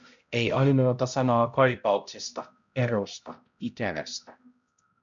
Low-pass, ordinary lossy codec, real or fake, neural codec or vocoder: 7.2 kHz; MP3, 64 kbps; fake; codec, 16 kHz, 0.5 kbps, X-Codec, HuBERT features, trained on balanced general audio